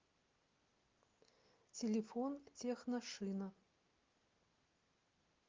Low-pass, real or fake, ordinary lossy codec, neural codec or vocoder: 7.2 kHz; real; Opus, 24 kbps; none